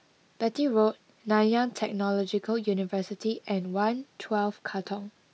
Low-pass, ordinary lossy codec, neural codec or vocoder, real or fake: none; none; none; real